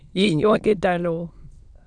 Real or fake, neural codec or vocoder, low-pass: fake; autoencoder, 22.05 kHz, a latent of 192 numbers a frame, VITS, trained on many speakers; 9.9 kHz